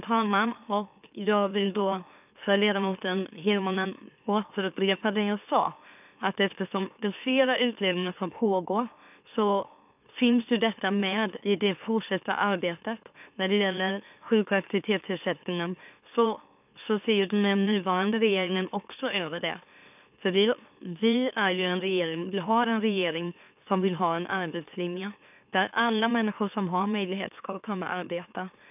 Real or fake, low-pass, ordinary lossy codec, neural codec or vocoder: fake; 3.6 kHz; none; autoencoder, 44.1 kHz, a latent of 192 numbers a frame, MeloTTS